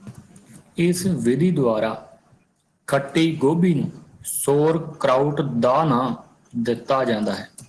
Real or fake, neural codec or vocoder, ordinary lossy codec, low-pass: real; none; Opus, 16 kbps; 10.8 kHz